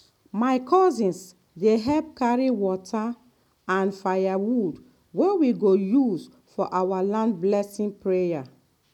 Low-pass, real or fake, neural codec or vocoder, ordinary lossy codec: 19.8 kHz; real; none; none